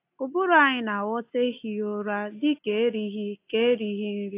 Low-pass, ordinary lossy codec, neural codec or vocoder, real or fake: 3.6 kHz; AAC, 24 kbps; none; real